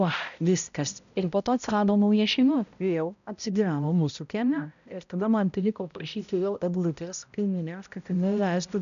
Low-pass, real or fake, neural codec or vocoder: 7.2 kHz; fake; codec, 16 kHz, 0.5 kbps, X-Codec, HuBERT features, trained on balanced general audio